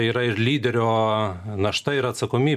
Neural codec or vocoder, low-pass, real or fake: none; 14.4 kHz; real